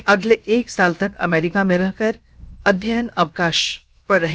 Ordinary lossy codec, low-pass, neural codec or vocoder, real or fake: none; none; codec, 16 kHz, 0.7 kbps, FocalCodec; fake